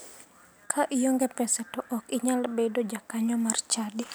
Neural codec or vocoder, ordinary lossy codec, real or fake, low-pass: none; none; real; none